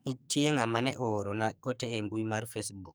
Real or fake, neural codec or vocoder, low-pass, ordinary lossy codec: fake; codec, 44.1 kHz, 2.6 kbps, SNAC; none; none